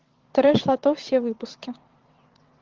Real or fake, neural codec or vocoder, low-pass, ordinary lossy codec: fake; vocoder, 22.05 kHz, 80 mel bands, WaveNeXt; 7.2 kHz; Opus, 16 kbps